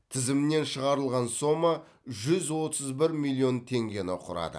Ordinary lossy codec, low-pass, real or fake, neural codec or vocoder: none; none; real; none